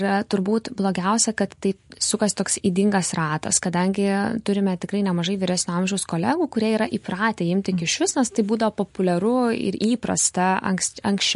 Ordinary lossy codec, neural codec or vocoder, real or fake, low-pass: MP3, 48 kbps; none; real; 10.8 kHz